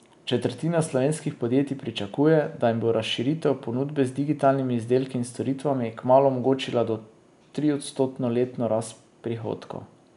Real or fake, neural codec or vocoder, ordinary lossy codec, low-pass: real; none; none; 10.8 kHz